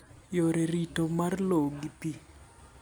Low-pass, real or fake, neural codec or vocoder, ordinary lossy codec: none; real; none; none